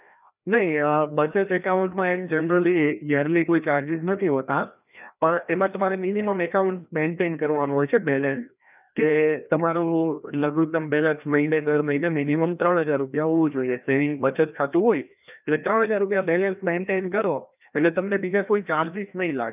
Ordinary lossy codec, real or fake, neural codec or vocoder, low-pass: none; fake; codec, 16 kHz, 1 kbps, FreqCodec, larger model; 3.6 kHz